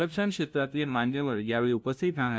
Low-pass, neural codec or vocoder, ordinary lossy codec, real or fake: none; codec, 16 kHz, 0.5 kbps, FunCodec, trained on LibriTTS, 25 frames a second; none; fake